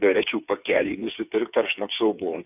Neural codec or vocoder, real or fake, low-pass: codec, 16 kHz, 8 kbps, FreqCodec, smaller model; fake; 3.6 kHz